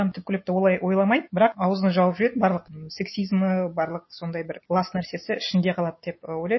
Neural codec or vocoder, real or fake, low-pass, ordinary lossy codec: none; real; 7.2 kHz; MP3, 24 kbps